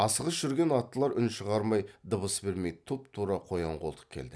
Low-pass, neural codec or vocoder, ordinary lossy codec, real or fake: none; none; none; real